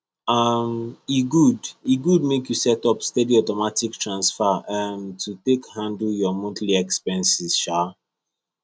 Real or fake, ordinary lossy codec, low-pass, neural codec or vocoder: real; none; none; none